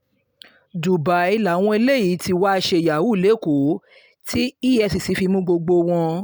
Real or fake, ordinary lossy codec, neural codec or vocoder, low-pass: real; none; none; none